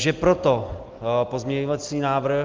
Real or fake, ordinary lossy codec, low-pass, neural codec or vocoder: real; Opus, 32 kbps; 7.2 kHz; none